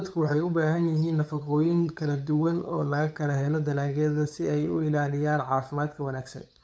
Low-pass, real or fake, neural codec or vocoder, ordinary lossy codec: none; fake; codec, 16 kHz, 8 kbps, FunCodec, trained on LibriTTS, 25 frames a second; none